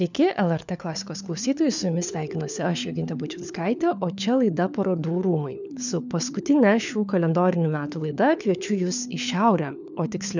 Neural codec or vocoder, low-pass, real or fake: codec, 16 kHz, 4 kbps, FunCodec, trained on LibriTTS, 50 frames a second; 7.2 kHz; fake